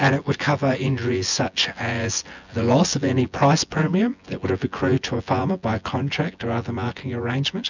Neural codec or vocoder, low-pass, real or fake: vocoder, 24 kHz, 100 mel bands, Vocos; 7.2 kHz; fake